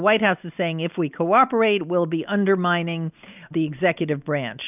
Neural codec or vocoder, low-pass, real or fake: none; 3.6 kHz; real